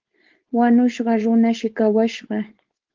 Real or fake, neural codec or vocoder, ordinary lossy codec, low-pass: fake; codec, 16 kHz, 4.8 kbps, FACodec; Opus, 16 kbps; 7.2 kHz